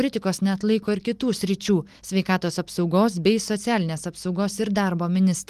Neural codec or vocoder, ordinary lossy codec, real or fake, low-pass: none; Opus, 24 kbps; real; 14.4 kHz